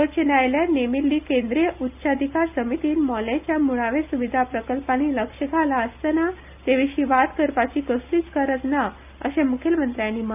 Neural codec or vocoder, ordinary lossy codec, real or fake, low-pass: none; none; real; 3.6 kHz